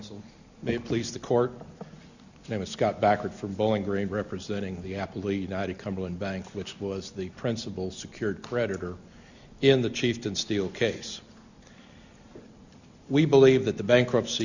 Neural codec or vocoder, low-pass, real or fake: none; 7.2 kHz; real